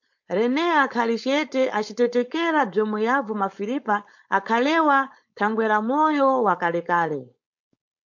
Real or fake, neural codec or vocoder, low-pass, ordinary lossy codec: fake; codec, 16 kHz, 4.8 kbps, FACodec; 7.2 kHz; MP3, 48 kbps